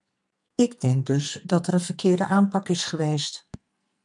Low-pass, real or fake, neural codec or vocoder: 10.8 kHz; fake; codec, 44.1 kHz, 2.6 kbps, SNAC